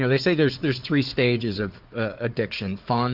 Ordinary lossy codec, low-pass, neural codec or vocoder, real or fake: Opus, 32 kbps; 5.4 kHz; codec, 16 kHz, 4 kbps, FunCodec, trained on Chinese and English, 50 frames a second; fake